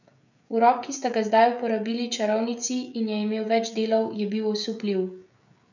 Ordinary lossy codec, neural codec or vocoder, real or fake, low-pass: none; codec, 16 kHz, 16 kbps, FreqCodec, smaller model; fake; 7.2 kHz